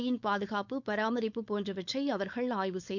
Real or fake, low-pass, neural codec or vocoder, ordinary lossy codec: fake; 7.2 kHz; codec, 16 kHz, 4.8 kbps, FACodec; none